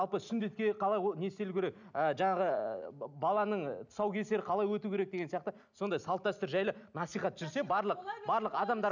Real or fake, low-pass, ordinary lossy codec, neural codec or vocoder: real; 7.2 kHz; none; none